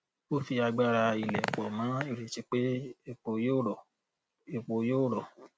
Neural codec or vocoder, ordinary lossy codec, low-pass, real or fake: none; none; none; real